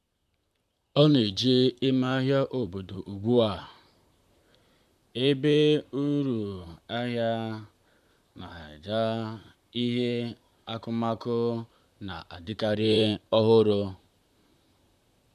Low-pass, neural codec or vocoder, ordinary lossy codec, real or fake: 14.4 kHz; vocoder, 44.1 kHz, 128 mel bands, Pupu-Vocoder; MP3, 96 kbps; fake